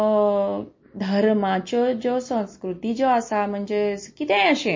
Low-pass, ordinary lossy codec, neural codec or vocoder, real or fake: 7.2 kHz; MP3, 32 kbps; none; real